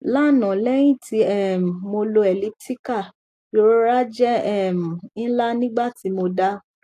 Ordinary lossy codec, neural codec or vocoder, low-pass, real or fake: AAC, 64 kbps; none; 14.4 kHz; real